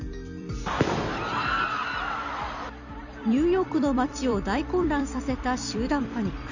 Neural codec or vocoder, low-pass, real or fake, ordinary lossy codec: vocoder, 44.1 kHz, 128 mel bands every 256 samples, BigVGAN v2; 7.2 kHz; fake; none